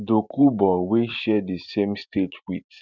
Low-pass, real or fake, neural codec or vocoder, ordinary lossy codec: 7.2 kHz; fake; vocoder, 44.1 kHz, 128 mel bands every 512 samples, BigVGAN v2; none